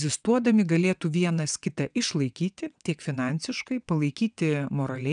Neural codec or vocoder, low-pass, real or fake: vocoder, 22.05 kHz, 80 mel bands, WaveNeXt; 9.9 kHz; fake